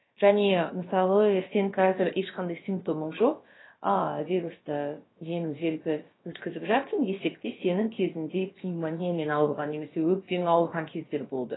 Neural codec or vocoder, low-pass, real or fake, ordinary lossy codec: codec, 16 kHz, about 1 kbps, DyCAST, with the encoder's durations; 7.2 kHz; fake; AAC, 16 kbps